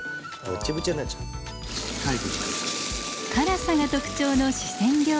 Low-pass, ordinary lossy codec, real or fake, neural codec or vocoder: none; none; real; none